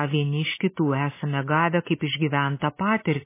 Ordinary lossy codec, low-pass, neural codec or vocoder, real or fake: MP3, 16 kbps; 3.6 kHz; none; real